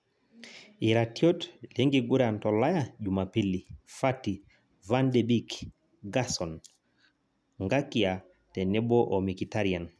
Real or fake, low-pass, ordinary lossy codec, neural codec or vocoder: real; none; none; none